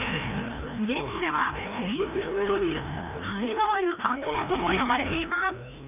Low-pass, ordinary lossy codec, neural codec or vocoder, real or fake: 3.6 kHz; none; codec, 16 kHz, 1 kbps, FreqCodec, larger model; fake